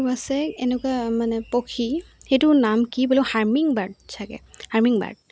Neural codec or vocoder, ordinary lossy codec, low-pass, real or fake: none; none; none; real